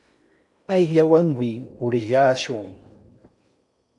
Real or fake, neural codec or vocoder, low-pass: fake; codec, 16 kHz in and 24 kHz out, 0.8 kbps, FocalCodec, streaming, 65536 codes; 10.8 kHz